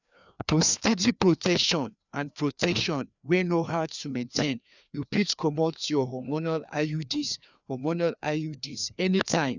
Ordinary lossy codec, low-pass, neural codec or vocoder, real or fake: none; 7.2 kHz; codec, 16 kHz, 2 kbps, FreqCodec, larger model; fake